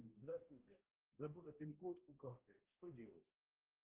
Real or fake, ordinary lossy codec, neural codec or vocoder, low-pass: fake; Opus, 16 kbps; codec, 16 kHz, 0.5 kbps, X-Codec, HuBERT features, trained on balanced general audio; 3.6 kHz